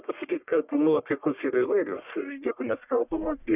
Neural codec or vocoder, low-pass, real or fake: codec, 44.1 kHz, 1.7 kbps, Pupu-Codec; 3.6 kHz; fake